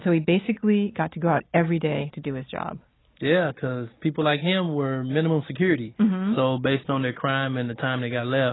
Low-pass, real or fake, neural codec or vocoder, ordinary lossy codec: 7.2 kHz; real; none; AAC, 16 kbps